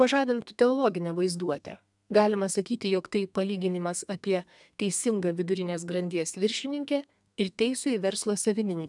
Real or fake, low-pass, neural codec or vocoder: fake; 10.8 kHz; codec, 32 kHz, 1.9 kbps, SNAC